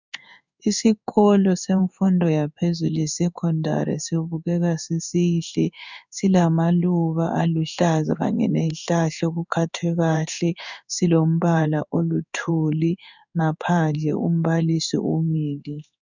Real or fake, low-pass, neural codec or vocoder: fake; 7.2 kHz; codec, 16 kHz in and 24 kHz out, 1 kbps, XY-Tokenizer